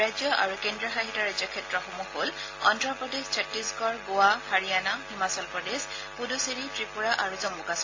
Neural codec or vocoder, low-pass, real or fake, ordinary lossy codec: none; 7.2 kHz; real; AAC, 32 kbps